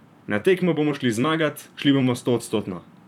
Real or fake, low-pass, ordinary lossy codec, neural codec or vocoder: fake; 19.8 kHz; none; vocoder, 44.1 kHz, 128 mel bands, Pupu-Vocoder